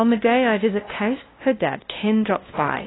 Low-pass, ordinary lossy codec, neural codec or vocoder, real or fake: 7.2 kHz; AAC, 16 kbps; codec, 16 kHz, 0.5 kbps, FunCodec, trained on LibriTTS, 25 frames a second; fake